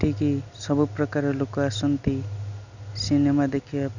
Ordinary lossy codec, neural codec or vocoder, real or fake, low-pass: none; none; real; 7.2 kHz